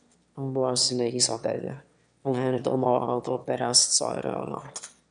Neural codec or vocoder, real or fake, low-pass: autoencoder, 22.05 kHz, a latent of 192 numbers a frame, VITS, trained on one speaker; fake; 9.9 kHz